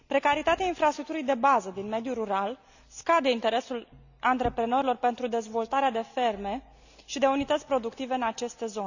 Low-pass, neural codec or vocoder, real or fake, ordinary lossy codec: 7.2 kHz; none; real; none